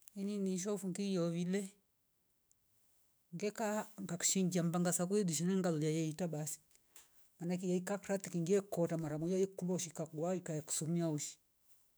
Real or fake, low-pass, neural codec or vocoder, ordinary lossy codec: fake; none; autoencoder, 48 kHz, 128 numbers a frame, DAC-VAE, trained on Japanese speech; none